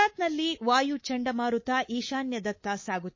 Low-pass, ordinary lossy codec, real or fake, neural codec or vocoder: 7.2 kHz; MP3, 32 kbps; fake; codec, 24 kHz, 3.1 kbps, DualCodec